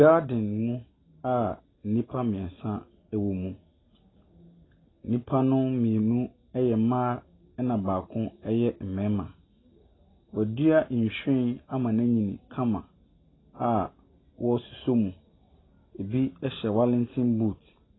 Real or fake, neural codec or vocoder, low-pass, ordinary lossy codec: real; none; 7.2 kHz; AAC, 16 kbps